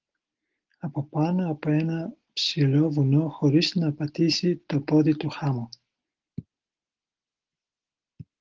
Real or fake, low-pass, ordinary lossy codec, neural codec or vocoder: real; 7.2 kHz; Opus, 16 kbps; none